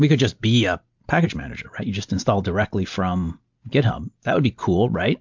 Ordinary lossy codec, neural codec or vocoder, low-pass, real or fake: MP3, 64 kbps; none; 7.2 kHz; real